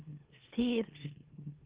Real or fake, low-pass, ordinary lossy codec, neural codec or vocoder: fake; 3.6 kHz; Opus, 16 kbps; autoencoder, 44.1 kHz, a latent of 192 numbers a frame, MeloTTS